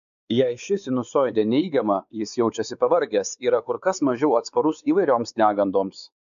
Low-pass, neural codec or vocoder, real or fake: 7.2 kHz; codec, 16 kHz, 4 kbps, X-Codec, WavLM features, trained on Multilingual LibriSpeech; fake